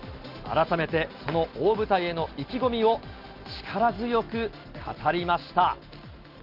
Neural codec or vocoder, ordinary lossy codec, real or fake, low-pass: none; Opus, 32 kbps; real; 5.4 kHz